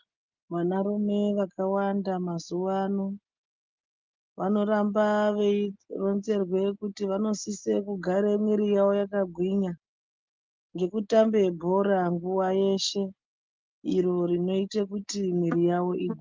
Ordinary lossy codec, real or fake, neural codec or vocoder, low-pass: Opus, 24 kbps; real; none; 7.2 kHz